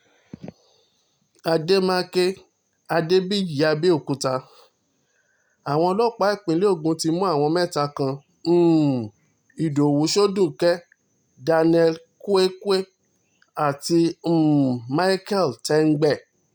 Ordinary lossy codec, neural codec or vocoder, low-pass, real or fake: none; none; none; real